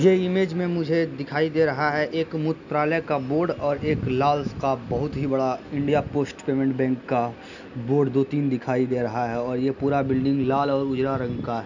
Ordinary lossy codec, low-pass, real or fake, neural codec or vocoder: none; 7.2 kHz; real; none